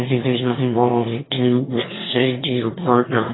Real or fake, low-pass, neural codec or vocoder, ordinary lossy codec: fake; 7.2 kHz; autoencoder, 22.05 kHz, a latent of 192 numbers a frame, VITS, trained on one speaker; AAC, 16 kbps